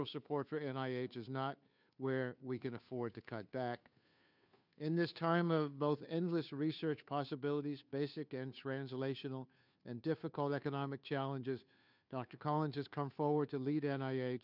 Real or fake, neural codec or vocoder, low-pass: fake; codec, 16 kHz, 2 kbps, FunCodec, trained on Chinese and English, 25 frames a second; 5.4 kHz